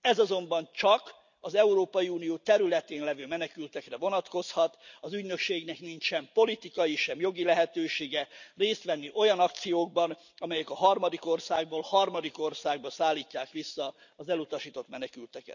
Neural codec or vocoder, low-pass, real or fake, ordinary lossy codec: none; 7.2 kHz; real; none